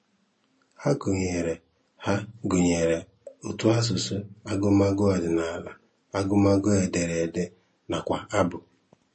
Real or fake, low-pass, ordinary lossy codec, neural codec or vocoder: real; 10.8 kHz; MP3, 32 kbps; none